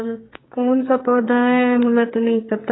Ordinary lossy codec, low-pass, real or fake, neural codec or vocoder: AAC, 16 kbps; 7.2 kHz; fake; codec, 44.1 kHz, 2.6 kbps, SNAC